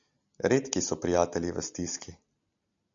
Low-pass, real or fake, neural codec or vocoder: 7.2 kHz; real; none